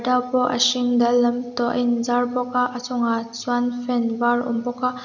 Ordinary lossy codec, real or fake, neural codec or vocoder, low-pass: none; real; none; 7.2 kHz